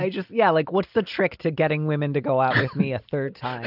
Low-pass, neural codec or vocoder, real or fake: 5.4 kHz; none; real